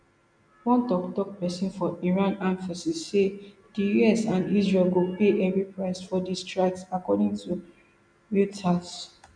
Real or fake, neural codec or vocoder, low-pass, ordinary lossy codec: real; none; 9.9 kHz; none